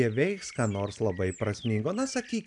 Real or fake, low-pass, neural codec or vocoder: real; 10.8 kHz; none